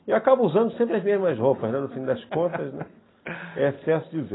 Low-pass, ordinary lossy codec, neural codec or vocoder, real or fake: 7.2 kHz; AAC, 16 kbps; none; real